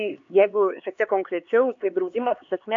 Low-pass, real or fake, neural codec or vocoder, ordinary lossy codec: 7.2 kHz; fake; codec, 16 kHz, 4 kbps, X-Codec, HuBERT features, trained on LibriSpeech; AAC, 48 kbps